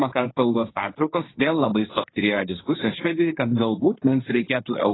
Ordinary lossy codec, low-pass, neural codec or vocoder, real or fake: AAC, 16 kbps; 7.2 kHz; codec, 16 kHz, 1.1 kbps, Voila-Tokenizer; fake